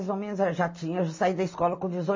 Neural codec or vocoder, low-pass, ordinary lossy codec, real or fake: none; 7.2 kHz; MP3, 32 kbps; real